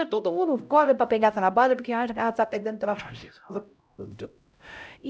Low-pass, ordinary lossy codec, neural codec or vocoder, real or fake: none; none; codec, 16 kHz, 0.5 kbps, X-Codec, HuBERT features, trained on LibriSpeech; fake